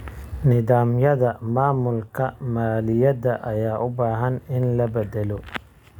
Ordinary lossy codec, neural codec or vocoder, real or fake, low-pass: none; none; real; 19.8 kHz